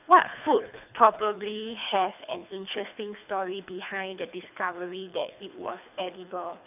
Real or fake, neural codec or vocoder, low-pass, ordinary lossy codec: fake; codec, 24 kHz, 3 kbps, HILCodec; 3.6 kHz; none